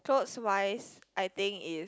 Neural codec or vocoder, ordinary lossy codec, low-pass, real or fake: none; none; none; real